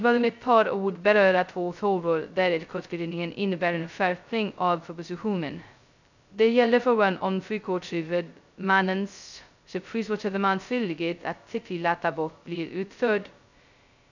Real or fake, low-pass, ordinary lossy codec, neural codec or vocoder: fake; 7.2 kHz; none; codec, 16 kHz, 0.2 kbps, FocalCodec